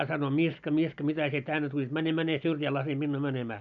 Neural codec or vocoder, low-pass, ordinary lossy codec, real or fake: none; 7.2 kHz; none; real